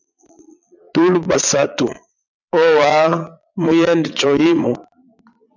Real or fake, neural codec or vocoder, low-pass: fake; vocoder, 22.05 kHz, 80 mel bands, Vocos; 7.2 kHz